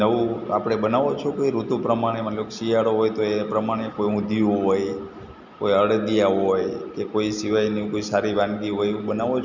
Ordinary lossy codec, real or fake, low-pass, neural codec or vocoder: Opus, 64 kbps; real; 7.2 kHz; none